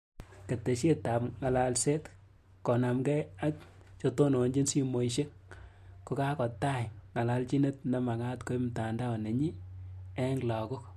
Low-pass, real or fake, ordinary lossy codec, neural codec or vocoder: 14.4 kHz; real; MP3, 64 kbps; none